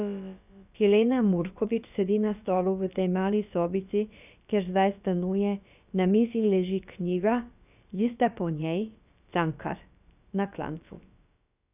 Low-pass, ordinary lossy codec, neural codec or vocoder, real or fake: 3.6 kHz; none; codec, 16 kHz, about 1 kbps, DyCAST, with the encoder's durations; fake